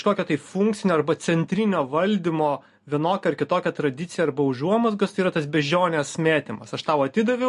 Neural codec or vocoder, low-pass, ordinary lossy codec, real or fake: none; 10.8 kHz; MP3, 48 kbps; real